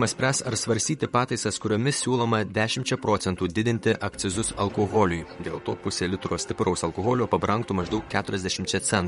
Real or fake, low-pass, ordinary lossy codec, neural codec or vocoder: fake; 19.8 kHz; MP3, 48 kbps; vocoder, 44.1 kHz, 128 mel bands, Pupu-Vocoder